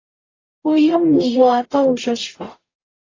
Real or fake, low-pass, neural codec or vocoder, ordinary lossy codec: fake; 7.2 kHz; codec, 44.1 kHz, 0.9 kbps, DAC; AAC, 32 kbps